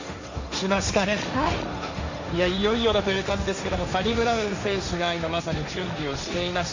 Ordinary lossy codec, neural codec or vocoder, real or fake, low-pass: Opus, 64 kbps; codec, 16 kHz, 1.1 kbps, Voila-Tokenizer; fake; 7.2 kHz